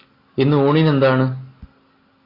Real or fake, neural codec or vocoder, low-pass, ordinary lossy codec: real; none; 5.4 kHz; AAC, 24 kbps